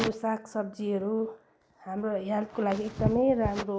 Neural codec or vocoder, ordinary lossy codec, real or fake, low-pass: none; none; real; none